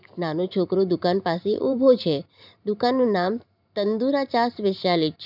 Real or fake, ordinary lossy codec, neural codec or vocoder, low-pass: fake; none; vocoder, 44.1 kHz, 128 mel bands every 512 samples, BigVGAN v2; 5.4 kHz